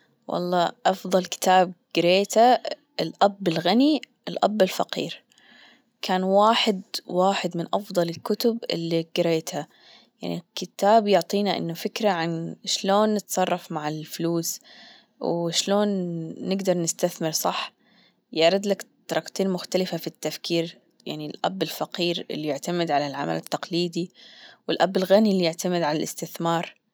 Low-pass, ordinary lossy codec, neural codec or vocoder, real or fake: none; none; none; real